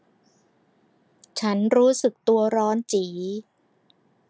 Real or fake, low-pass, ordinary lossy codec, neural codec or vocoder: real; none; none; none